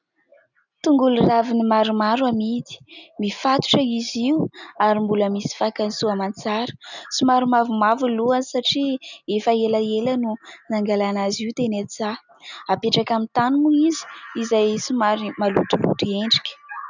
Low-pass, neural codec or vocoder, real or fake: 7.2 kHz; none; real